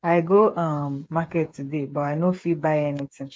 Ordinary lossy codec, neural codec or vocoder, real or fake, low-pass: none; codec, 16 kHz, 8 kbps, FreqCodec, smaller model; fake; none